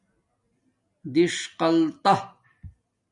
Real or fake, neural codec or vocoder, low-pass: real; none; 10.8 kHz